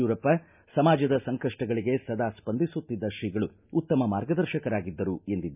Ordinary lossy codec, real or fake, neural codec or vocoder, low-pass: none; real; none; 3.6 kHz